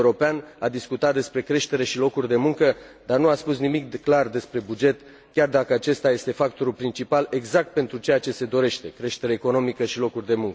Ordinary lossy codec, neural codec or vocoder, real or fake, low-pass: none; none; real; none